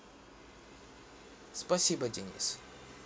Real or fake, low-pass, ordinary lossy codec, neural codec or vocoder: real; none; none; none